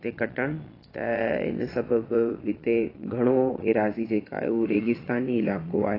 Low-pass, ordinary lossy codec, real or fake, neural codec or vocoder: 5.4 kHz; AAC, 24 kbps; fake; vocoder, 22.05 kHz, 80 mel bands, Vocos